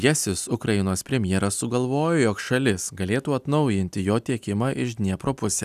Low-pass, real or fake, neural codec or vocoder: 14.4 kHz; real; none